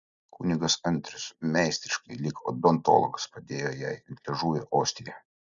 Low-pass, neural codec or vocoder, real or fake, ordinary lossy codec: 7.2 kHz; none; real; MP3, 96 kbps